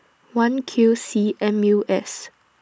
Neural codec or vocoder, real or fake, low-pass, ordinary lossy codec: none; real; none; none